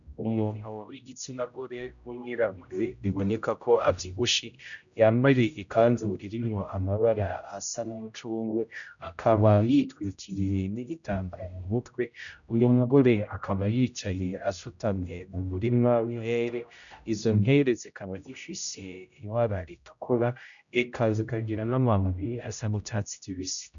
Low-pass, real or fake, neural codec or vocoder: 7.2 kHz; fake; codec, 16 kHz, 0.5 kbps, X-Codec, HuBERT features, trained on general audio